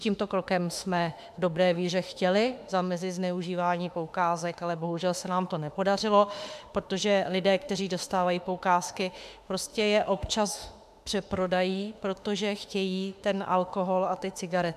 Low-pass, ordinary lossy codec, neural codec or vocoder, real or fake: 14.4 kHz; AAC, 96 kbps; autoencoder, 48 kHz, 32 numbers a frame, DAC-VAE, trained on Japanese speech; fake